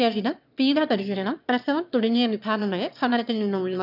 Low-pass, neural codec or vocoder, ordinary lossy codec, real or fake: 5.4 kHz; autoencoder, 22.05 kHz, a latent of 192 numbers a frame, VITS, trained on one speaker; none; fake